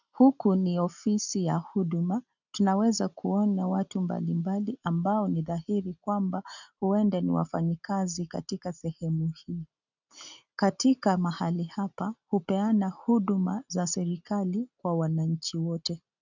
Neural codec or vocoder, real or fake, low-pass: none; real; 7.2 kHz